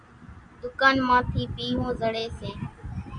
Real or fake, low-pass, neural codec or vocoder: real; 9.9 kHz; none